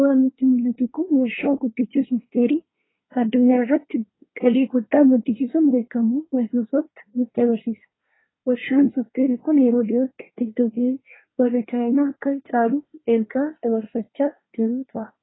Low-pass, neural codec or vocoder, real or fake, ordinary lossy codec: 7.2 kHz; codec, 24 kHz, 1 kbps, SNAC; fake; AAC, 16 kbps